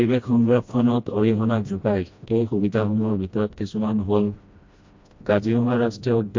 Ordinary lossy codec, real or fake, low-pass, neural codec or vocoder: MP3, 48 kbps; fake; 7.2 kHz; codec, 16 kHz, 1 kbps, FreqCodec, smaller model